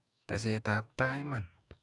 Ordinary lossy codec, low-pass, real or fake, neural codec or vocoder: none; 10.8 kHz; fake; codec, 44.1 kHz, 2.6 kbps, DAC